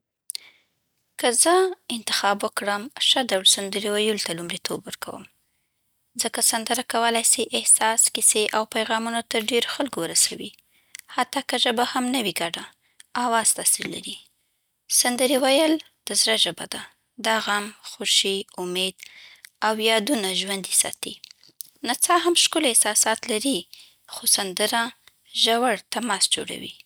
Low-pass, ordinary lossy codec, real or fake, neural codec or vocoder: none; none; real; none